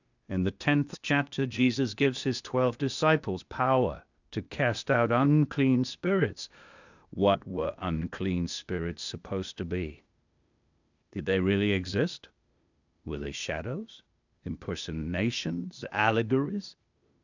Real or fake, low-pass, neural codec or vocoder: fake; 7.2 kHz; codec, 16 kHz, 0.8 kbps, ZipCodec